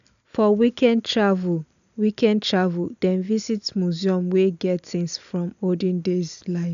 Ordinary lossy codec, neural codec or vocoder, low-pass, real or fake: none; none; 7.2 kHz; real